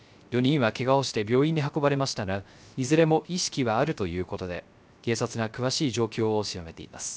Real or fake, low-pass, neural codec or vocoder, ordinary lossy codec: fake; none; codec, 16 kHz, 0.3 kbps, FocalCodec; none